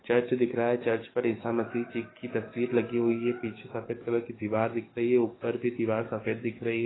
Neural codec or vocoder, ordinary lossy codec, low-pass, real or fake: codec, 44.1 kHz, 7.8 kbps, DAC; AAC, 16 kbps; 7.2 kHz; fake